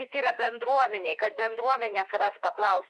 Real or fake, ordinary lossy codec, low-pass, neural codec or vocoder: fake; Opus, 64 kbps; 10.8 kHz; autoencoder, 48 kHz, 32 numbers a frame, DAC-VAE, trained on Japanese speech